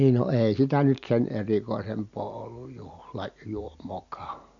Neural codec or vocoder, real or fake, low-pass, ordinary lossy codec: none; real; 7.2 kHz; none